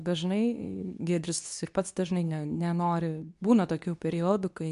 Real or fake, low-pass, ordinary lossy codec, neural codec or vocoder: fake; 10.8 kHz; MP3, 96 kbps; codec, 24 kHz, 0.9 kbps, WavTokenizer, medium speech release version 2